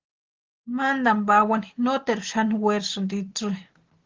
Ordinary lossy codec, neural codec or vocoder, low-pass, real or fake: Opus, 16 kbps; none; 7.2 kHz; real